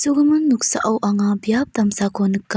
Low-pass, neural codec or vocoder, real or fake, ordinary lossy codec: none; none; real; none